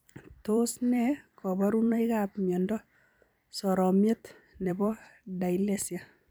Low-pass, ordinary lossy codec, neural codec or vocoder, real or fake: none; none; vocoder, 44.1 kHz, 128 mel bands every 256 samples, BigVGAN v2; fake